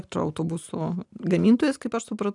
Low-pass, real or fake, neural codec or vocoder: 10.8 kHz; real; none